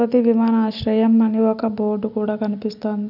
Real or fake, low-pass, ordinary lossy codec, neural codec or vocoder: real; 5.4 kHz; none; none